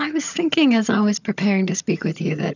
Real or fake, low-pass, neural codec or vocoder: fake; 7.2 kHz; vocoder, 22.05 kHz, 80 mel bands, HiFi-GAN